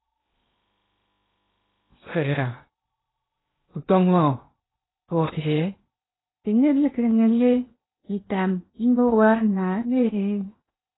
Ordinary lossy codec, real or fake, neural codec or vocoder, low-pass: AAC, 16 kbps; fake; codec, 16 kHz in and 24 kHz out, 0.8 kbps, FocalCodec, streaming, 65536 codes; 7.2 kHz